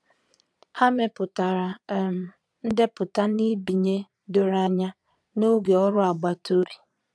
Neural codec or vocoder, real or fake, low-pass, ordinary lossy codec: vocoder, 22.05 kHz, 80 mel bands, Vocos; fake; none; none